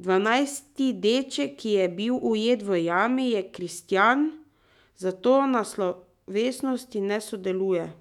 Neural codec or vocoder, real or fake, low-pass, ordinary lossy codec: autoencoder, 48 kHz, 128 numbers a frame, DAC-VAE, trained on Japanese speech; fake; 19.8 kHz; none